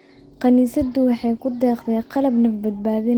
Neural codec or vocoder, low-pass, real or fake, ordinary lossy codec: none; 19.8 kHz; real; Opus, 16 kbps